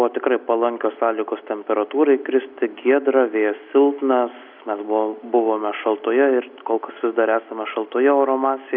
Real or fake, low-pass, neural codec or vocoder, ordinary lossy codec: real; 5.4 kHz; none; AAC, 48 kbps